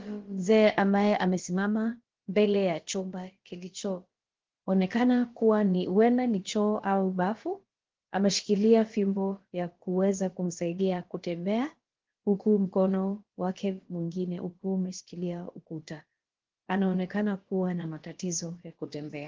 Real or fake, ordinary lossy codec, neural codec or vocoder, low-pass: fake; Opus, 16 kbps; codec, 16 kHz, about 1 kbps, DyCAST, with the encoder's durations; 7.2 kHz